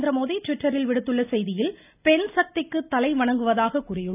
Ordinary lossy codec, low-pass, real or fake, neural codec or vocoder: none; 3.6 kHz; real; none